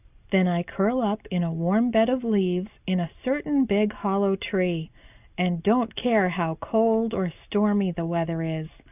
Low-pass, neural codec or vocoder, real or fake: 3.6 kHz; none; real